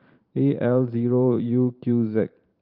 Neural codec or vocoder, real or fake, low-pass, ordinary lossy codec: vocoder, 44.1 kHz, 128 mel bands every 512 samples, BigVGAN v2; fake; 5.4 kHz; Opus, 32 kbps